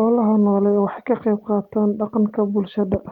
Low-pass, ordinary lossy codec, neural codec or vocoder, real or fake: 19.8 kHz; Opus, 24 kbps; none; real